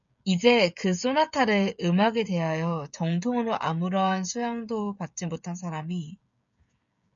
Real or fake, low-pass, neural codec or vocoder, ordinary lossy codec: fake; 7.2 kHz; codec, 16 kHz, 16 kbps, FreqCodec, smaller model; MP3, 64 kbps